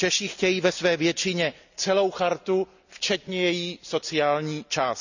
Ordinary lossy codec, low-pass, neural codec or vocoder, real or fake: none; 7.2 kHz; none; real